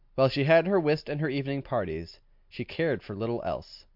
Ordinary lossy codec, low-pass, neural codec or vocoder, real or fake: MP3, 48 kbps; 5.4 kHz; none; real